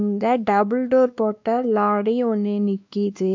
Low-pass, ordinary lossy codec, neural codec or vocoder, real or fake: 7.2 kHz; MP3, 64 kbps; codec, 16 kHz, 2 kbps, X-Codec, WavLM features, trained on Multilingual LibriSpeech; fake